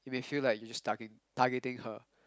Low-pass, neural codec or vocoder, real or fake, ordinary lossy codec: none; none; real; none